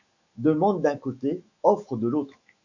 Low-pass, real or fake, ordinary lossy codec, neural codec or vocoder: 7.2 kHz; fake; AAC, 48 kbps; autoencoder, 48 kHz, 128 numbers a frame, DAC-VAE, trained on Japanese speech